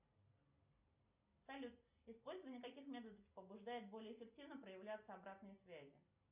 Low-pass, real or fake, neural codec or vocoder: 3.6 kHz; real; none